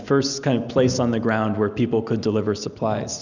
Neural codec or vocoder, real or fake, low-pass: none; real; 7.2 kHz